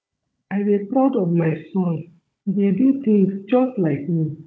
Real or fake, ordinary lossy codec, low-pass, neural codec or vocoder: fake; none; none; codec, 16 kHz, 16 kbps, FunCodec, trained on Chinese and English, 50 frames a second